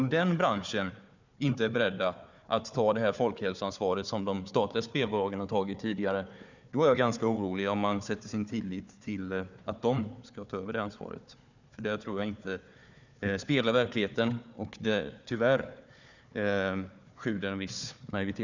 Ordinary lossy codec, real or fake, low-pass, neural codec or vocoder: none; fake; 7.2 kHz; codec, 16 kHz, 4 kbps, FunCodec, trained on Chinese and English, 50 frames a second